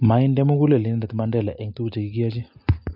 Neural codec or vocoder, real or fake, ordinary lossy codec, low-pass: none; real; MP3, 48 kbps; 5.4 kHz